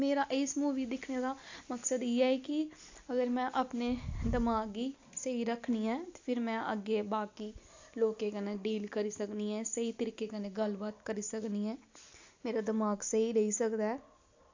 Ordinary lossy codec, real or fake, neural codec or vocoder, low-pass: AAC, 48 kbps; real; none; 7.2 kHz